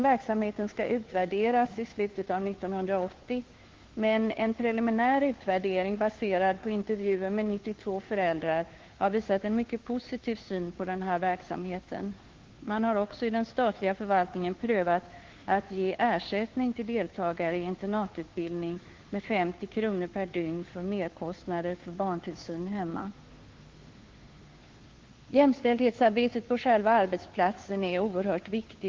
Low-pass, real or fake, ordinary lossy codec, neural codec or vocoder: 7.2 kHz; fake; Opus, 16 kbps; codec, 16 kHz, 2 kbps, FunCodec, trained on Chinese and English, 25 frames a second